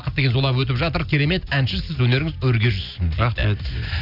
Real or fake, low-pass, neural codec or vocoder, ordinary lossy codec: real; 5.4 kHz; none; none